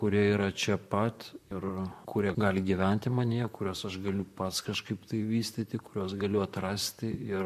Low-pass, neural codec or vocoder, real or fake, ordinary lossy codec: 14.4 kHz; vocoder, 44.1 kHz, 128 mel bands, Pupu-Vocoder; fake; MP3, 64 kbps